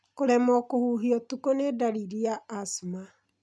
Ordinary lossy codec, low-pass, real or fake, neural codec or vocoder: none; none; real; none